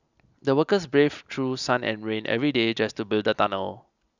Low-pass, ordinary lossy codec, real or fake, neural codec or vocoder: 7.2 kHz; none; real; none